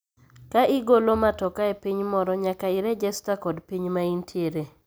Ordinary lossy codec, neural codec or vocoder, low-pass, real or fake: none; none; none; real